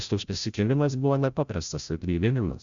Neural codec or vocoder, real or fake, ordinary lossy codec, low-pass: codec, 16 kHz, 0.5 kbps, FreqCodec, larger model; fake; Opus, 64 kbps; 7.2 kHz